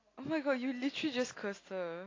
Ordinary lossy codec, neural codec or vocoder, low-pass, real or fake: AAC, 32 kbps; none; 7.2 kHz; real